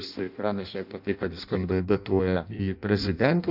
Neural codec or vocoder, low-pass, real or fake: codec, 16 kHz in and 24 kHz out, 0.6 kbps, FireRedTTS-2 codec; 5.4 kHz; fake